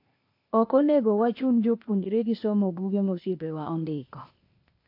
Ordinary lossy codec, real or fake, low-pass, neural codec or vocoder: AAC, 32 kbps; fake; 5.4 kHz; codec, 16 kHz, 0.8 kbps, ZipCodec